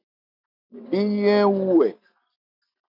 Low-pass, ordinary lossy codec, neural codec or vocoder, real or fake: 5.4 kHz; MP3, 48 kbps; none; real